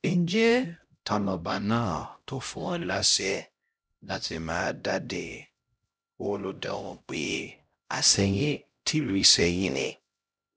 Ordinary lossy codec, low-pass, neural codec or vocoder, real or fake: none; none; codec, 16 kHz, 0.5 kbps, X-Codec, HuBERT features, trained on LibriSpeech; fake